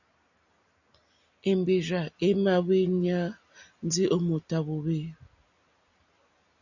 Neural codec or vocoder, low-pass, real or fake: none; 7.2 kHz; real